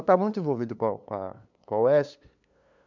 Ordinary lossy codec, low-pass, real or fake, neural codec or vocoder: MP3, 64 kbps; 7.2 kHz; fake; codec, 16 kHz, 2 kbps, FunCodec, trained on LibriTTS, 25 frames a second